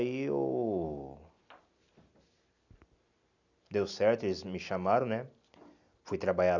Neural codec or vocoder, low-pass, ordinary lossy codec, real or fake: none; 7.2 kHz; none; real